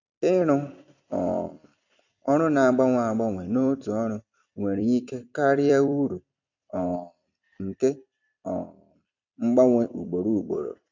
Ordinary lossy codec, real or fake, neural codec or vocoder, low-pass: none; fake; vocoder, 44.1 kHz, 128 mel bands every 256 samples, BigVGAN v2; 7.2 kHz